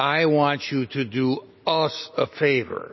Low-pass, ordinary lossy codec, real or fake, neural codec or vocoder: 7.2 kHz; MP3, 24 kbps; real; none